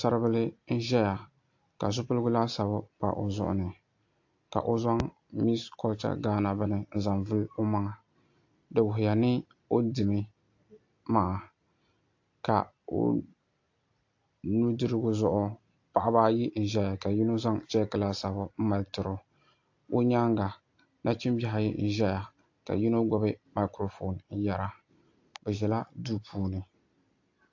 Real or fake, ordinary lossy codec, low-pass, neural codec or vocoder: real; AAC, 48 kbps; 7.2 kHz; none